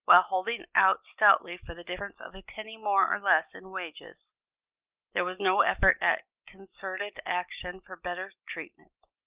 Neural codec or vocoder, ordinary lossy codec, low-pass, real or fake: none; Opus, 32 kbps; 3.6 kHz; real